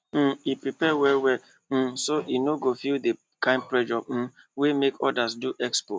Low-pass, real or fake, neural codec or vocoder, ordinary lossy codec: none; real; none; none